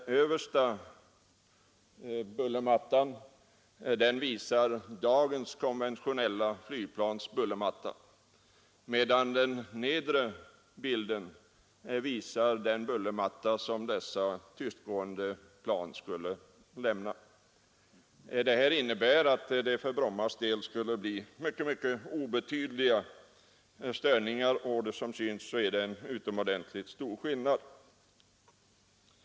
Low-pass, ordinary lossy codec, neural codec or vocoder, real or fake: none; none; none; real